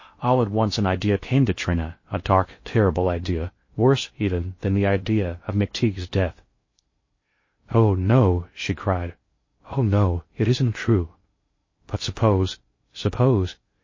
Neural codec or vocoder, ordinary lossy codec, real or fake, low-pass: codec, 16 kHz in and 24 kHz out, 0.6 kbps, FocalCodec, streaming, 2048 codes; MP3, 32 kbps; fake; 7.2 kHz